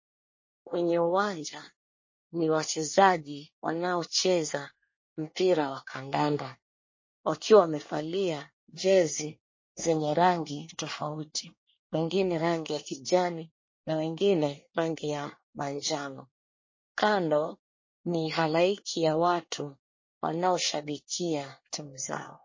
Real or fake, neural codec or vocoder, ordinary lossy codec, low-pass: fake; codec, 24 kHz, 1 kbps, SNAC; MP3, 32 kbps; 7.2 kHz